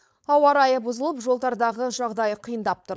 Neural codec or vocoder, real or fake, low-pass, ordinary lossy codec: codec, 16 kHz, 4.8 kbps, FACodec; fake; none; none